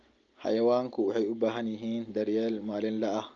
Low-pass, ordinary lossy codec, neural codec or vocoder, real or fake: 7.2 kHz; Opus, 24 kbps; none; real